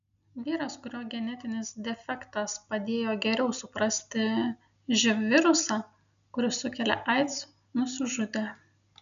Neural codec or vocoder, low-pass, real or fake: none; 7.2 kHz; real